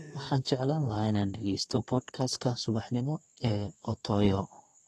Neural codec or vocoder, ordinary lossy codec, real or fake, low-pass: codec, 32 kHz, 1.9 kbps, SNAC; AAC, 32 kbps; fake; 14.4 kHz